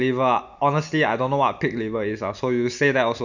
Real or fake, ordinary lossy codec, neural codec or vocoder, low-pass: real; none; none; 7.2 kHz